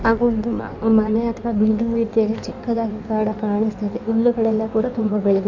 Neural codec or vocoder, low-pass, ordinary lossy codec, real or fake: codec, 16 kHz in and 24 kHz out, 1.1 kbps, FireRedTTS-2 codec; 7.2 kHz; none; fake